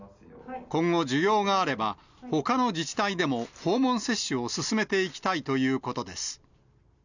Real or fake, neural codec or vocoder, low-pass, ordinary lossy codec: real; none; 7.2 kHz; none